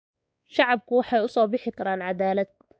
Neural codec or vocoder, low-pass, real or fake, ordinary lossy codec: codec, 16 kHz, 4 kbps, X-Codec, WavLM features, trained on Multilingual LibriSpeech; none; fake; none